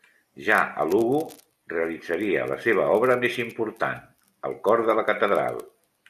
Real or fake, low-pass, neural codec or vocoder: real; 14.4 kHz; none